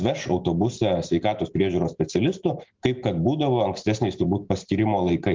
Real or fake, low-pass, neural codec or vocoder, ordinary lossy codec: real; 7.2 kHz; none; Opus, 32 kbps